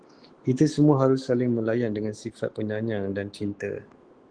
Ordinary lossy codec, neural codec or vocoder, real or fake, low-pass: Opus, 16 kbps; autoencoder, 48 kHz, 128 numbers a frame, DAC-VAE, trained on Japanese speech; fake; 9.9 kHz